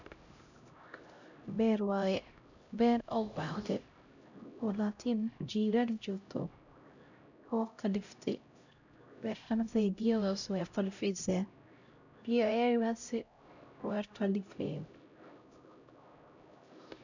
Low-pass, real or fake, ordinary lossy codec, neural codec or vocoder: 7.2 kHz; fake; none; codec, 16 kHz, 0.5 kbps, X-Codec, HuBERT features, trained on LibriSpeech